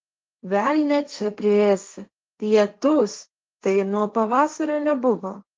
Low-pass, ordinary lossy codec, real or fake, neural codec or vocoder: 7.2 kHz; Opus, 32 kbps; fake; codec, 16 kHz, 1.1 kbps, Voila-Tokenizer